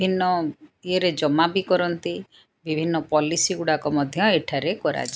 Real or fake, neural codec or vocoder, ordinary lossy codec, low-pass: real; none; none; none